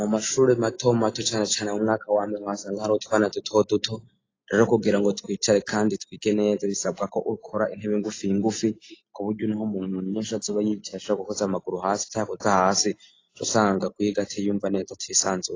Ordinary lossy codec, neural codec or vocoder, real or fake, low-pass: AAC, 32 kbps; none; real; 7.2 kHz